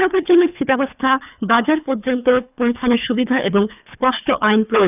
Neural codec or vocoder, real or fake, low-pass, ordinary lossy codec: codec, 24 kHz, 3 kbps, HILCodec; fake; 3.6 kHz; none